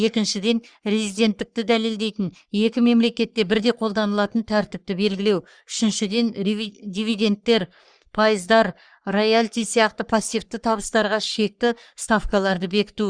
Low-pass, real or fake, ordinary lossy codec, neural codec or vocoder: 9.9 kHz; fake; Opus, 64 kbps; codec, 44.1 kHz, 3.4 kbps, Pupu-Codec